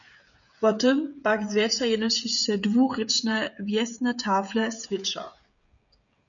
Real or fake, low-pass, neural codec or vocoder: fake; 7.2 kHz; codec, 16 kHz, 16 kbps, FreqCodec, smaller model